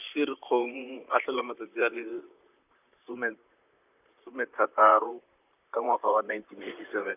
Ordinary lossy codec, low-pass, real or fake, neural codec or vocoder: none; 3.6 kHz; fake; vocoder, 44.1 kHz, 128 mel bands, Pupu-Vocoder